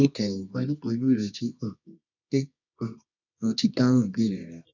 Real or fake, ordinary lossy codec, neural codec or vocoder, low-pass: fake; none; codec, 24 kHz, 0.9 kbps, WavTokenizer, medium music audio release; 7.2 kHz